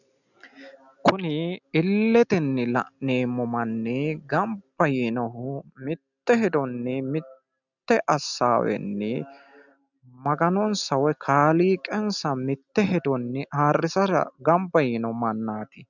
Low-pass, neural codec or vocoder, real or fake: 7.2 kHz; none; real